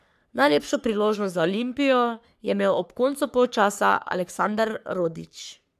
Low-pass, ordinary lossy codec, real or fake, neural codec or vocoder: 14.4 kHz; none; fake; codec, 44.1 kHz, 3.4 kbps, Pupu-Codec